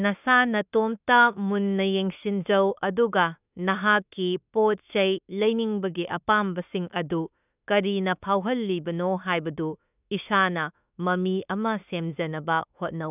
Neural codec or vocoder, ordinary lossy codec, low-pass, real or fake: autoencoder, 48 kHz, 32 numbers a frame, DAC-VAE, trained on Japanese speech; none; 3.6 kHz; fake